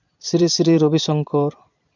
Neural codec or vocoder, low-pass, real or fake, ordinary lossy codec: vocoder, 22.05 kHz, 80 mel bands, Vocos; 7.2 kHz; fake; none